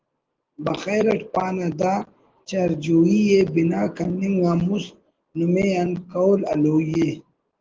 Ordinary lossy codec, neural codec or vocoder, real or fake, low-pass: Opus, 16 kbps; none; real; 7.2 kHz